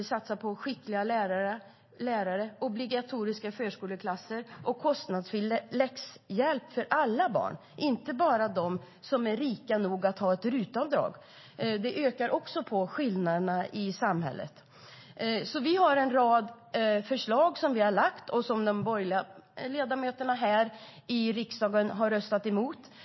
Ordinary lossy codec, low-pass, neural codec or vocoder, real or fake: MP3, 24 kbps; 7.2 kHz; none; real